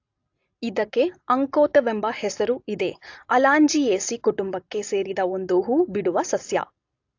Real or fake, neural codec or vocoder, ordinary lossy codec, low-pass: real; none; AAC, 48 kbps; 7.2 kHz